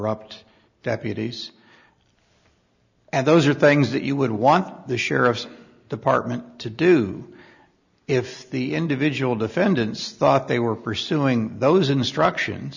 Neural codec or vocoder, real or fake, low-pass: none; real; 7.2 kHz